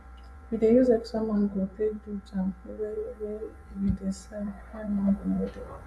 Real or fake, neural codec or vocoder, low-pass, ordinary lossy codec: fake; vocoder, 24 kHz, 100 mel bands, Vocos; none; none